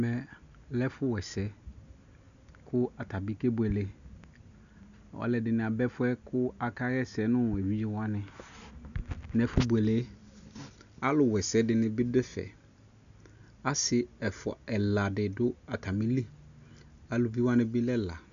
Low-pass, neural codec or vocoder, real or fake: 7.2 kHz; none; real